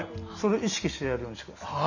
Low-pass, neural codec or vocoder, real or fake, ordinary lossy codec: 7.2 kHz; none; real; none